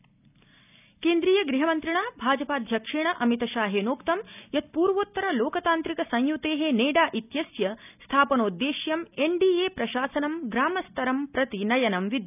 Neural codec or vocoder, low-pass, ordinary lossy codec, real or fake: none; 3.6 kHz; none; real